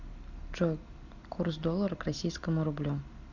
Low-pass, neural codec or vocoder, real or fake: 7.2 kHz; none; real